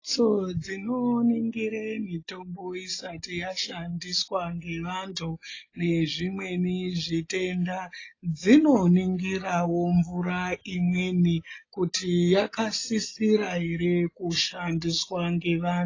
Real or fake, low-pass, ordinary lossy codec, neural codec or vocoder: real; 7.2 kHz; AAC, 32 kbps; none